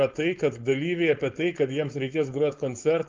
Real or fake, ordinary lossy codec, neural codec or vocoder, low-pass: fake; Opus, 24 kbps; codec, 16 kHz, 4.8 kbps, FACodec; 7.2 kHz